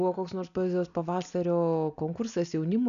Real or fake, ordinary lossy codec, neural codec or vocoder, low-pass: real; MP3, 96 kbps; none; 7.2 kHz